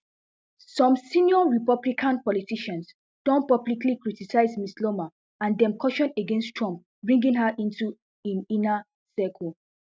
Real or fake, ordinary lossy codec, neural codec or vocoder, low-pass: real; none; none; none